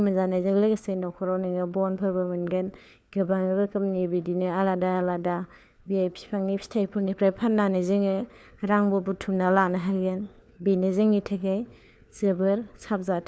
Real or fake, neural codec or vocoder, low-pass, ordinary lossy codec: fake; codec, 16 kHz, 4 kbps, FunCodec, trained on LibriTTS, 50 frames a second; none; none